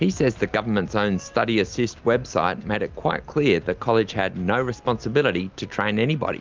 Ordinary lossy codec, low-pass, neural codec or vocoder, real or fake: Opus, 24 kbps; 7.2 kHz; none; real